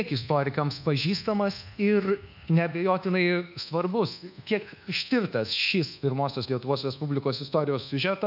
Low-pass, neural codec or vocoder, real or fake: 5.4 kHz; codec, 24 kHz, 1.2 kbps, DualCodec; fake